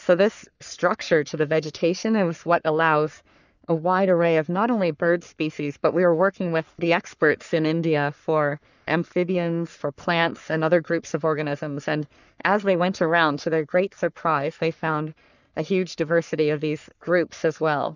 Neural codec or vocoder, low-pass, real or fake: codec, 44.1 kHz, 3.4 kbps, Pupu-Codec; 7.2 kHz; fake